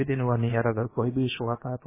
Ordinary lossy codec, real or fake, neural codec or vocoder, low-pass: MP3, 16 kbps; fake; codec, 16 kHz, about 1 kbps, DyCAST, with the encoder's durations; 3.6 kHz